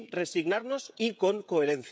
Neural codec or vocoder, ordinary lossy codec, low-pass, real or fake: codec, 16 kHz, 16 kbps, FreqCodec, smaller model; none; none; fake